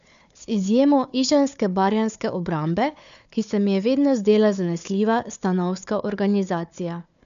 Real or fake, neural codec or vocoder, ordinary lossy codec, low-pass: fake; codec, 16 kHz, 4 kbps, FunCodec, trained on Chinese and English, 50 frames a second; none; 7.2 kHz